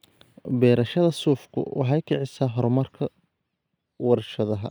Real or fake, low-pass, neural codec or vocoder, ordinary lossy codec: real; none; none; none